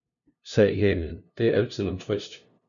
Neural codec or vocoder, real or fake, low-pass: codec, 16 kHz, 0.5 kbps, FunCodec, trained on LibriTTS, 25 frames a second; fake; 7.2 kHz